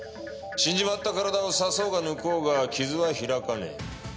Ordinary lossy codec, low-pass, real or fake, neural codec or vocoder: none; none; real; none